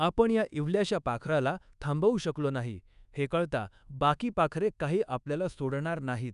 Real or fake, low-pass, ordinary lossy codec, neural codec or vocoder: fake; 10.8 kHz; none; codec, 24 kHz, 1.2 kbps, DualCodec